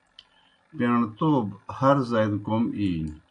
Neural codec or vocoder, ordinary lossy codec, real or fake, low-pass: none; AAC, 48 kbps; real; 9.9 kHz